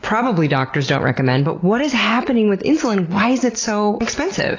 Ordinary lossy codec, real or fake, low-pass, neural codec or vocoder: AAC, 32 kbps; real; 7.2 kHz; none